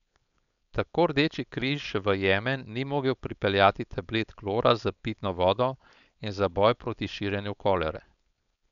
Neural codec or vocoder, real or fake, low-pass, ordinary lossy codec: codec, 16 kHz, 4.8 kbps, FACodec; fake; 7.2 kHz; none